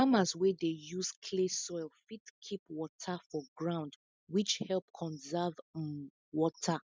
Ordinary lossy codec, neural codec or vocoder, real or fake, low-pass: none; none; real; none